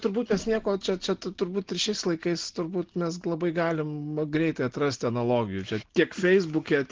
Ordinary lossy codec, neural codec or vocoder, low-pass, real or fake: Opus, 16 kbps; none; 7.2 kHz; real